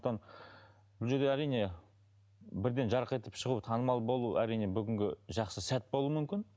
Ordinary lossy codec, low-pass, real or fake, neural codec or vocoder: none; none; real; none